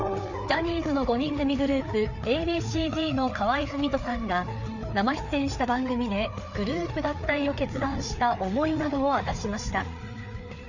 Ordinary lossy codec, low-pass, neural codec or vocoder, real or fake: none; 7.2 kHz; codec, 16 kHz, 4 kbps, FreqCodec, larger model; fake